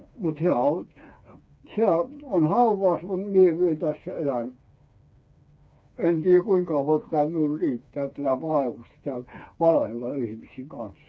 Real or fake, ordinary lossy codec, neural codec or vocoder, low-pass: fake; none; codec, 16 kHz, 4 kbps, FreqCodec, smaller model; none